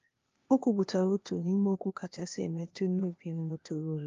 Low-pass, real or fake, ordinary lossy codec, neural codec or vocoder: 7.2 kHz; fake; Opus, 32 kbps; codec, 16 kHz, 0.8 kbps, ZipCodec